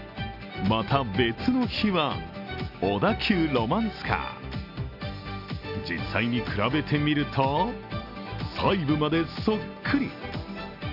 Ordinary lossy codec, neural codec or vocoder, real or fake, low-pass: none; none; real; 5.4 kHz